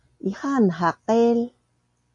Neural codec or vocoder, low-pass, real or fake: none; 10.8 kHz; real